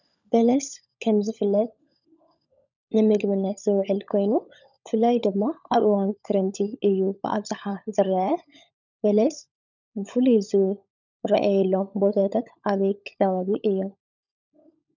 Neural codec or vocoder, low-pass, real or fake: codec, 16 kHz, 16 kbps, FunCodec, trained on LibriTTS, 50 frames a second; 7.2 kHz; fake